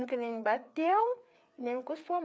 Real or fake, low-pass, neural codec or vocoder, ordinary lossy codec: fake; none; codec, 16 kHz, 4 kbps, FreqCodec, larger model; none